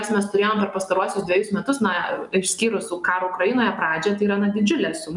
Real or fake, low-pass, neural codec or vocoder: real; 10.8 kHz; none